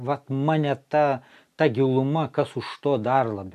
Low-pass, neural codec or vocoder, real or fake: 14.4 kHz; none; real